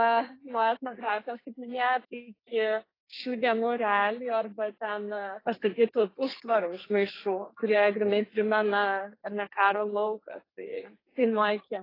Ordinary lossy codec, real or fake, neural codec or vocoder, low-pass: AAC, 24 kbps; fake; codec, 32 kHz, 1.9 kbps, SNAC; 5.4 kHz